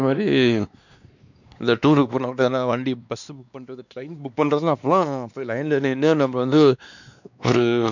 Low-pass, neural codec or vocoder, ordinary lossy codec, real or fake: 7.2 kHz; codec, 16 kHz, 2 kbps, X-Codec, WavLM features, trained on Multilingual LibriSpeech; none; fake